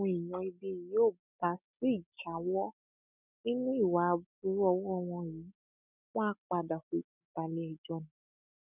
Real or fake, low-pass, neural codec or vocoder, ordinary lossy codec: real; 3.6 kHz; none; none